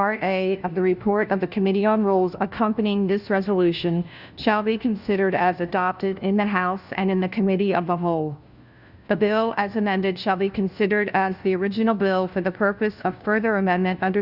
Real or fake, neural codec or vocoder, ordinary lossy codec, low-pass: fake; codec, 16 kHz, 1 kbps, FunCodec, trained on LibriTTS, 50 frames a second; Opus, 64 kbps; 5.4 kHz